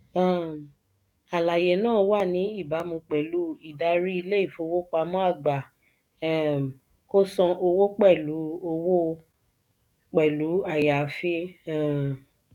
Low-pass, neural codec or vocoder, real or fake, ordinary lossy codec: 19.8 kHz; codec, 44.1 kHz, 7.8 kbps, DAC; fake; none